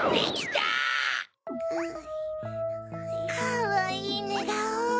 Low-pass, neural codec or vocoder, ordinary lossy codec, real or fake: none; none; none; real